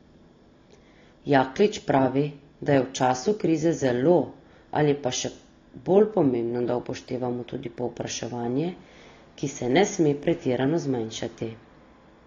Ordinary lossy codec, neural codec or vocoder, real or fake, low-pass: AAC, 32 kbps; none; real; 7.2 kHz